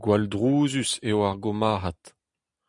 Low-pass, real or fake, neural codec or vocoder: 10.8 kHz; real; none